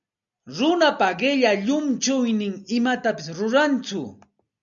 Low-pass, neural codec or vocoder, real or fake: 7.2 kHz; none; real